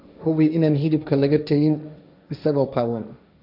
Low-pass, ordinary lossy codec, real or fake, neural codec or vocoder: 5.4 kHz; none; fake; codec, 16 kHz, 1.1 kbps, Voila-Tokenizer